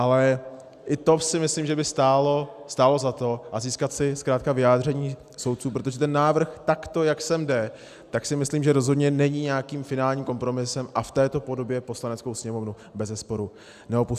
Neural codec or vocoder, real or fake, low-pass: none; real; 14.4 kHz